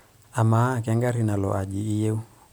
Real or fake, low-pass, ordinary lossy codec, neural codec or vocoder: real; none; none; none